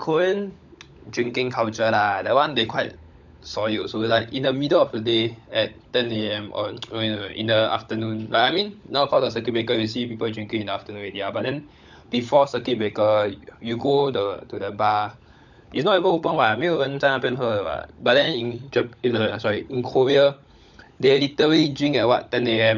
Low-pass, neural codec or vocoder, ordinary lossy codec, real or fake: 7.2 kHz; codec, 16 kHz, 16 kbps, FunCodec, trained on LibriTTS, 50 frames a second; none; fake